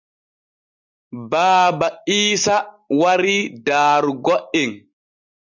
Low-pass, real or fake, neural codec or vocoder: 7.2 kHz; real; none